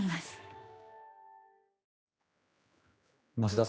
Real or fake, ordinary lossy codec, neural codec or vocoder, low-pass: fake; none; codec, 16 kHz, 2 kbps, X-Codec, HuBERT features, trained on general audio; none